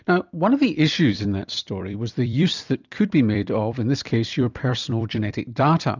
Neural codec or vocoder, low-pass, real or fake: vocoder, 44.1 kHz, 128 mel bands every 256 samples, BigVGAN v2; 7.2 kHz; fake